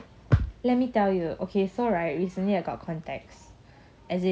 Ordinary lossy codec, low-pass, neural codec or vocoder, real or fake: none; none; none; real